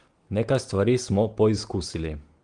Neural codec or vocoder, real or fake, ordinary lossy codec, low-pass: none; real; Opus, 24 kbps; 9.9 kHz